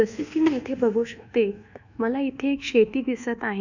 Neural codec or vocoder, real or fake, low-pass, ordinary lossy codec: codec, 24 kHz, 1.2 kbps, DualCodec; fake; 7.2 kHz; none